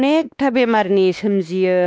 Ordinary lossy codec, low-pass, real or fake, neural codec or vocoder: none; none; fake; codec, 16 kHz, 2 kbps, X-Codec, WavLM features, trained on Multilingual LibriSpeech